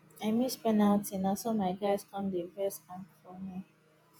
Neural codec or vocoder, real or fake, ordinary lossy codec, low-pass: vocoder, 48 kHz, 128 mel bands, Vocos; fake; none; none